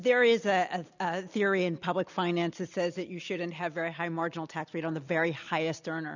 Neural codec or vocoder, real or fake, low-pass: none; real; 7.2 kHz